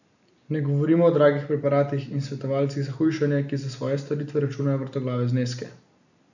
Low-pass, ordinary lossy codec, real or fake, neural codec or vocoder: 7.2 kHz; none; real; none